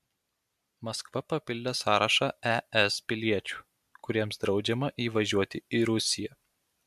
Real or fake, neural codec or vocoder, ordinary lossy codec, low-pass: real; none; MP3, 96 kbps; 14.4 kHz